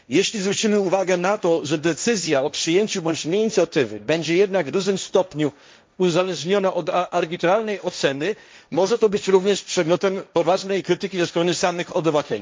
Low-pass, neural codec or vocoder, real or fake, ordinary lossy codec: none; codec, 16 kHz, 1.1 kbps, Voila-Tokenizer; fake; none